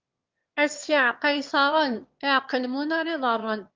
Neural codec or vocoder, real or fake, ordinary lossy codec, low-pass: autoencoder, 22.05 kHz, a latent of 192 numbers a frame, VITS, trained on one speaker; fake; Opus, 32 kbps; 7.2 kHz